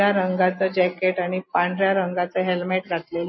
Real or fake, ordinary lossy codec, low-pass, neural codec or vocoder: real; MP3, 24 kbps; 7.2 kHz; none